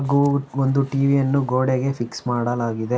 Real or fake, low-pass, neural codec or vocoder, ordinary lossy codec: real; none; none; none